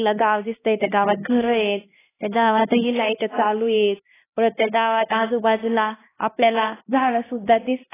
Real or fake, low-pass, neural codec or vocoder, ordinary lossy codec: fake; 3.6 kHz; codec, 16 kHz, 1 kbps, X-Codec, HuBERT features, trained on LibriSpeech; AAC, 16 kbps